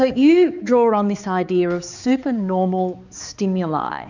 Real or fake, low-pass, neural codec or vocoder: fake; 7.2 kHz; codec, 16 kHz, 4 kbps, X-Codec, HuBERT features, trained on balanced general audio